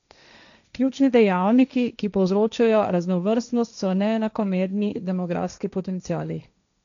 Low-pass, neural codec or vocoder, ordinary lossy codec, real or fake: 7.2 kHz; codec, 16 kHz, 1.1 kbps, Voila-Tokenizer; none; fake